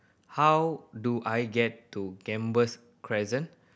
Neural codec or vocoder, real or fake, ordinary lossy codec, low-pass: none; real; none; none